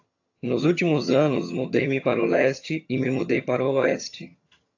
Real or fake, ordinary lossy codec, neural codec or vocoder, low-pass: fake; AAC, 48 kbps; vocoder, 22.05 kHz, 80 mel bands, HiFi-GAN; 7.2 kHz